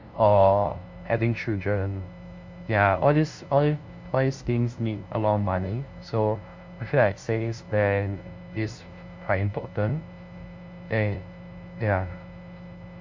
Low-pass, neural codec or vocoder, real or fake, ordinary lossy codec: 7.2 kHz; codec, 16 kHz, 0.5 kbps, FunCodec, trained on LibriTTS, 25 frames a second; fake; MP3, 48 kbps